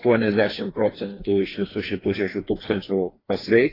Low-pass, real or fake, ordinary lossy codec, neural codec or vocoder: 5.4 kHz; fake; AAC, 24 kbps; codec, 16 kHz, 2 kbps, FreqCodec, larger model